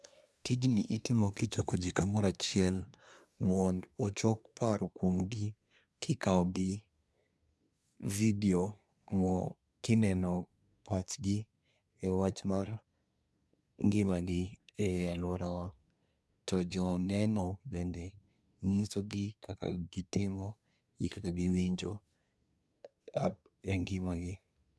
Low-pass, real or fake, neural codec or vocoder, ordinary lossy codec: none; fake; codec, 24 kHz, 1 kbps, SNAC; none